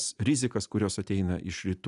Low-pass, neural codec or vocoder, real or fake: 10.8 kHz; none; real